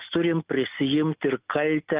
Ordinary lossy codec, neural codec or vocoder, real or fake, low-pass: Opus, 32 kbps; none; real; 3.6 kHz